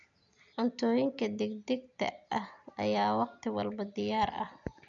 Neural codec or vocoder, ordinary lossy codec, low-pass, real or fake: none; none; 7.2 kHz; real